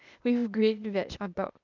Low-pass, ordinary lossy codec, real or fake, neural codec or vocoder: 7.2 kHz; none; fake; codec, 16 kHz, 0.8 kbps, ZipCodec